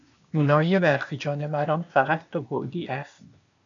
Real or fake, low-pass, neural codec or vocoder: fake; 7.2 kHz; codec, 16 kHz, 0.8 kbps, ZipCodec